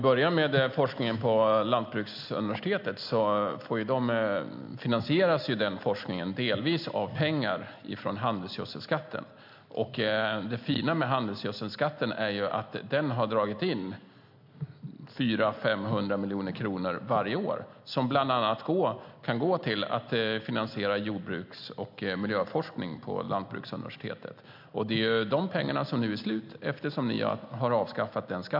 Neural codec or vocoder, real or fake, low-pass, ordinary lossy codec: none; real; 5.4 kHz; MP3, 48 kbps